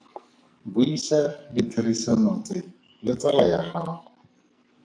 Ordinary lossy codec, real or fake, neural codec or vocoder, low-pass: AAC, 64 kbps; fake; codec, 44.1 kHz, 2.6 kbps, SNAC; 9.9 kHz